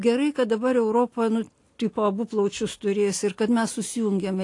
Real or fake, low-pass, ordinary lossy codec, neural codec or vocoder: real; 10.8 kHz; AAC, 48 kbps; none